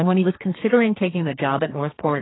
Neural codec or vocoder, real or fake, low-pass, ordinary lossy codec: codec, 32 kHz, 1.9 kbps, SNAC; fake; 7.2 kHz; AAC, 16 kbps